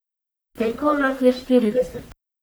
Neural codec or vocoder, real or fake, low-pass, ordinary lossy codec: codec, 44.1 kHz, 1.7 kbps, Pupu-Codec; fake; none; none